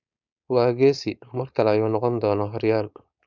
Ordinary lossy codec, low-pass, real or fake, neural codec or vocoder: none; 7.2 kHz; fake; codec, 16 kHz, 4.8 kbps, FACodec